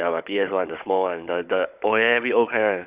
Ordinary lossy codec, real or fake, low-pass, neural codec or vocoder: Opus, 64 kbps; fake; 3.6 kHz; codec, 16 kHz, 16 kbps, FunCodec, trained on Chinese and English, 50 frames a second